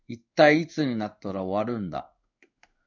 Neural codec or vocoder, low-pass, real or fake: codec, 16 kHz in and 24 kHz out, 1 kbps, XY-Tokenizer; 7.2 kHz; fake